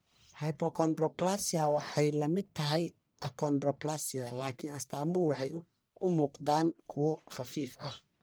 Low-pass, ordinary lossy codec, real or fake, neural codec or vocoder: none; none; fake; codec, 44.1 kHz, 1.7 kbps, Pupu-Codec